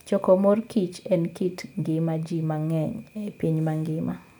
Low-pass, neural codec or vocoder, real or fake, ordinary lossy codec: none; none; real; none